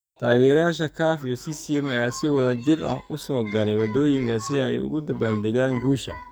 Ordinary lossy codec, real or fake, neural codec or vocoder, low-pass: none; fake; codec, 44.1 kHz, 2.6 kbps, SNAC; none